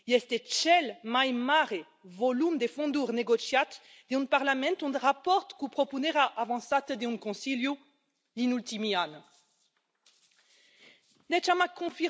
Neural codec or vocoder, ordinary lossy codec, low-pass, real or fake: none; none; none; real